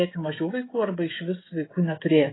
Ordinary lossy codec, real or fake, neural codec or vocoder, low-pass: AAC, 16 kbps; fake; codec, 16 kHz, 16 kbps, FreqCodec, smaller model; 7.2 kHz